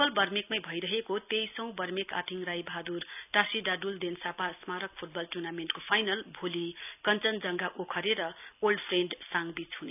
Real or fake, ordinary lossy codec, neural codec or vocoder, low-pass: real; none; none; 3.6 kHz